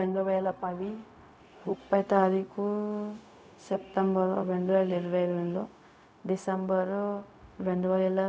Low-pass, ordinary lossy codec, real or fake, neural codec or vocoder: none; none; fake; codec, 16 kHz, 0.4 kbps, LongCat-Audio-Codec